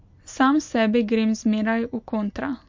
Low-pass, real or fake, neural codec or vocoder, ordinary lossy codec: 7.2 kHz; real; none; MP3, 48 kbps